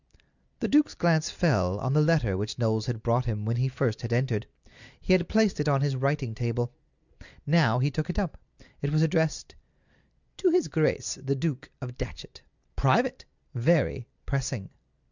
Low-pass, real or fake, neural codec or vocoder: 7.2 kHz; real; none